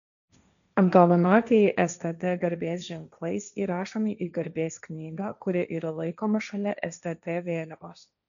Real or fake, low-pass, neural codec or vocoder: fake; 7.2 kHz; codec, 16 kHz, 1.1 kbps, Voila-Tokenizer